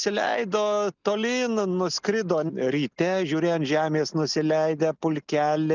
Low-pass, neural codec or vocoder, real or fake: 7.2 kHz; none; real